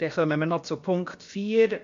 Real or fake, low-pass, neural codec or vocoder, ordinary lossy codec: fake; 7.2 kHz; codec, 16 kHz, about 1 kbps, DyCAST, with the encoder's durations; AAC, 48 kbps